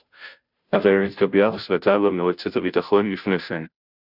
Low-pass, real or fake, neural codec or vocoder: 5.4 kHz; fake; codec, 16 kHz, 0.5 kbps, FunCodec, trained on Chinese and English, 25 frames a second